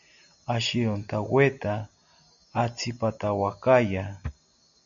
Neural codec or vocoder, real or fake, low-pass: none; real; 7.2 kHz